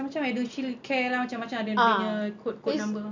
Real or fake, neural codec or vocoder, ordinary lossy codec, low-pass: real; none; MP3, 64 kbps; 7.2 kHz